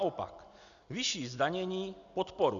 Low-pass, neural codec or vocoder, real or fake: 7.2 kHz; none; real